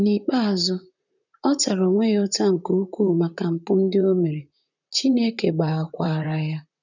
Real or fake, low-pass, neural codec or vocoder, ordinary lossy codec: fake; 7.2 kHz; vocoder, 44.1 kHz, 128 mel bands, Pupu-Vocoder; none